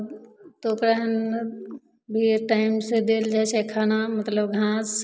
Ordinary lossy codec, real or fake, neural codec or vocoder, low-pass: none; real; none; none